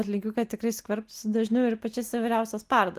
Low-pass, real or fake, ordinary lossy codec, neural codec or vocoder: 14.4 kHz; real; Opus, 24 kbps; none